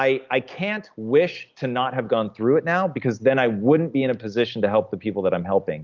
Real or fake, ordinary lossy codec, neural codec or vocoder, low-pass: real; Opus, 24 kbps; none; 7.2 kHz